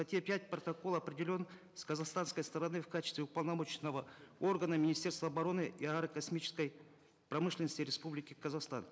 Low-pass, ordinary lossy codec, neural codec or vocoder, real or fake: none; none; none; real